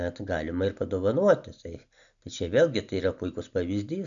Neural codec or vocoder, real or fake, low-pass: none; real; 7.2 kHz